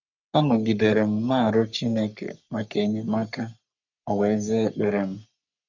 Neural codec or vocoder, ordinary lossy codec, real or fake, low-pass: codec, 44.1 kHz, 3.4 kbps, Pupu-Codec; none; fake; 7.2 kHz